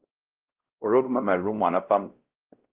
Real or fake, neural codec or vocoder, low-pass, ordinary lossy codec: fake; codec, 16 kHz, 0.5 kbps, X-Codec, WavLM features, trained on Multilingual LibriSpeech; 3.6 kHz; Opus, 16 kbps